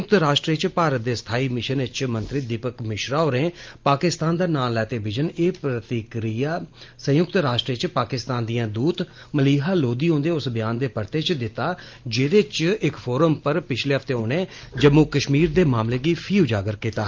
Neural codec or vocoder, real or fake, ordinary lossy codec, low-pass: none; real; Opus, 32 kbps; 7.2 kHz